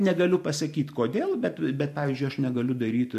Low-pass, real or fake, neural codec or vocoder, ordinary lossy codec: 14.4 kHz; fake; vocoder, 44.1 kHz, 128 mel bands every 512 samples, BigVGAN v2; MP3, 64 kbps